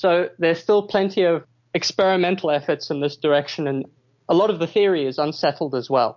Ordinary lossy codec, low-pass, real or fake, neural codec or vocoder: MP3, 48 kbps; 7.2 kHz; real; none